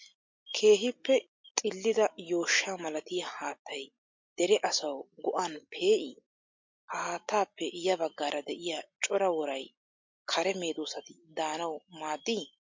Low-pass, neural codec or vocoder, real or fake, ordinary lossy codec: 7.2 kHz; none; real; MP3, 48 kbps